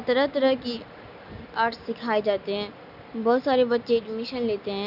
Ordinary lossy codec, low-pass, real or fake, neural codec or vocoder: none; 5.4 kHz; real; none